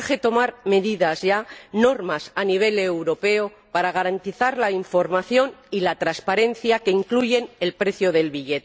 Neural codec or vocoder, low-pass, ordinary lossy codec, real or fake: none; none; none; real